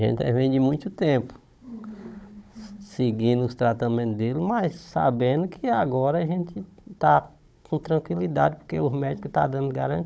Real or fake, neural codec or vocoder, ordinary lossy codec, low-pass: fake; codec, 16 kHz, 16 kbps, FunCodec, trained on Chinese and English, 50 frames a second; none; none